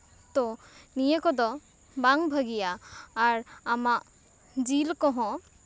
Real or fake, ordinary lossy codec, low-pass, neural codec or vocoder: real; none; none; none